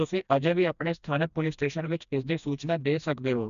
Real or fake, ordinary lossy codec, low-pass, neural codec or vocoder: fake; none; 7.2 kHz; codec, 16 kHz, 1 kbps, FreqCodec, smaller model